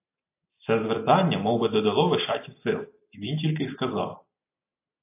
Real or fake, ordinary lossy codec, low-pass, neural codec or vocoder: real; AAC, 24 kbps; 3.6 kHz; none